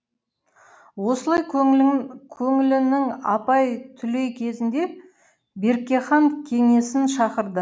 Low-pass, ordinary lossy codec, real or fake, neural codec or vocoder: none; none; real; none